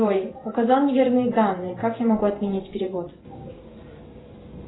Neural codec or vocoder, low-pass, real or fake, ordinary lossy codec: vocoder, 44.1 kHz, 128 mel bands every 512 samples, BigVGAN v2; 7.2 kHz; fake; AAC, 16 kbps